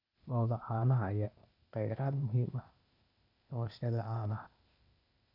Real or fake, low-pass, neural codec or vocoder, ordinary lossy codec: fake; 5.4 kHz; codec, 16 kHz, 0.8 kbps, ZipCodec; none